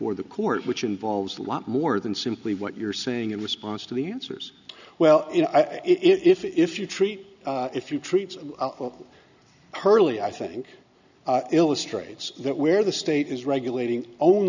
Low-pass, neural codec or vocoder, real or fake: 7.2 kHz; none; real